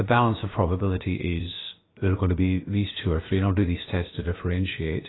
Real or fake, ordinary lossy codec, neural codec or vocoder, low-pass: fake; AAC, 16 kbps; codec, 16 kHz, about 1 kbps, DyCAST, with the encoder's durations; 7.2 kHz